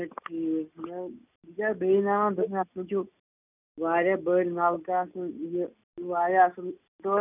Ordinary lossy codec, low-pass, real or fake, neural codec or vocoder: none; 3.6 kHz; real; none